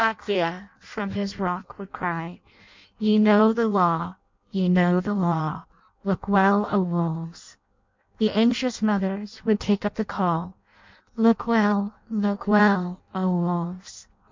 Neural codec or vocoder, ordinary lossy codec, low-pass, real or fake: codec, 16 kHz in and 24 kHz out, 0.6 kbps, FireRedTTS-2 codec; MP3, 64 kbps; 7.2 kHz; fake